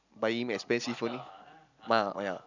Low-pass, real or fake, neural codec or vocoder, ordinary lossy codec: 7.2 kHz; fake; vocoder, 44.1 kHz, 128 mel bands every 256 samples, BigVGAN v2; none